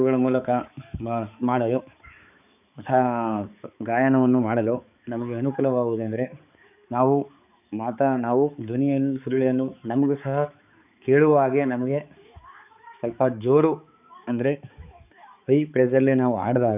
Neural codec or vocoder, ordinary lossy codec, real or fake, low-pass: codec, 16 kHz, 4 kbps, X-Codec, HuBERT features, trained on balanced general audio; none; fake; 3.6 kHz